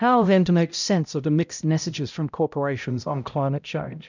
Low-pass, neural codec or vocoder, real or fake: 7.2 kHz; codec, 16 kHz, 0.5 kbps, X-Codec, HuBERT features, trained on balanced general audio; fake